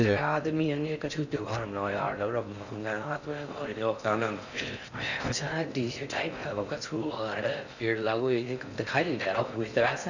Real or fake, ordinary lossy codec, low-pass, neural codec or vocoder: fake; none; 7.2 kHz; codec, 16 kHz in and 24 kHz out, 0.6 kbps, FocalCodec, streaming, 4096 codes